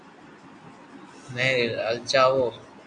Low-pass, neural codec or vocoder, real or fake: 9.9 kHz; none; real